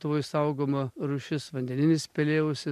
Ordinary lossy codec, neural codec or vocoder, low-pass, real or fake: MP3, 96 kbps; none; 14.4 kHz; real